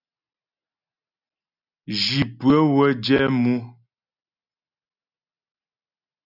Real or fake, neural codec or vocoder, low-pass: real; none; 5.4 kHz